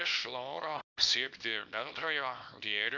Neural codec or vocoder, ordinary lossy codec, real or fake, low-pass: codec, 24 kHz, 0.9 kbps, WavTokenizer, small release; Opus, 64 kbps; fake; 7.2 kHz